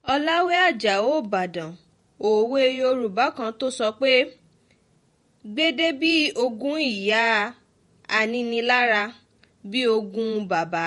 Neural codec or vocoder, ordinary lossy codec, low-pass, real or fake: vocoder, 48 kHz, 128 mel bands, Vocos; MP3, 48 kbps; 19.8 kHz; fake